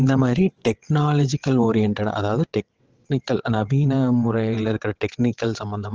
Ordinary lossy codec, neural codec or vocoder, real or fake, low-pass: Opus, 16 kbps; vocoder, 22.05 kHz, 80 mel bands, WaveNeXt; fake; 7.2 kHz